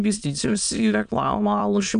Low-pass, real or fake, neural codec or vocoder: 9.9 kHz; fake; autoencoder, 22.05 kHz, a latent of 192 numbers a frame, VITS, trained on many speakers